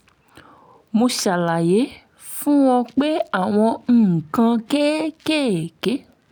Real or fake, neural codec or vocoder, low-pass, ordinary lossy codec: real; none; none; none